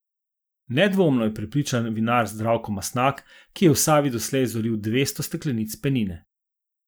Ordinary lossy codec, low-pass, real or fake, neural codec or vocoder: none; none; real; none